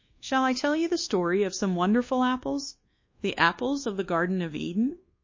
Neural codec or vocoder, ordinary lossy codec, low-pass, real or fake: codec, 24 kHz, 1.2 kbps, DualCodec; MP3, 32 kbps; 7.2 kHz; fake